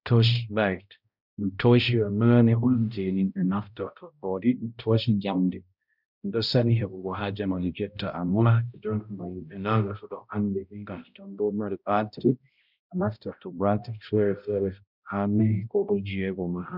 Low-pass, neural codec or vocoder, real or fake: 5.4 kHz; codec, 16 kHz, 0.5 kbps, X-Codec, HuBERT features, trained on balanced general audio; fake